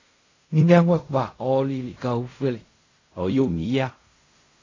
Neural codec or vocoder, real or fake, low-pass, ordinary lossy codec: codec, 16 kHz in and 24 kHz out, 0.4 kbps, LongCat-Audio-Codec, fine tuned four codebook decoder; fake; 7.2 kHz; AAC, 32 kbps